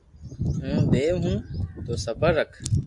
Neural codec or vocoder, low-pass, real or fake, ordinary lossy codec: none; 10.8 kHz; real; AAC, 64 kbps